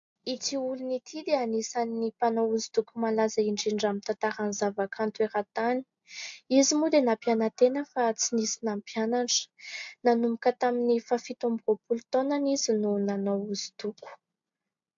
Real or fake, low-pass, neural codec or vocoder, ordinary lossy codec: real; 7.2 kHz; none; AAC, 64 kbps